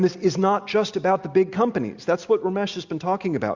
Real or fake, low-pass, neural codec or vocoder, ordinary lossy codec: real; 7.2 kHz; none; Opus, 64 kbps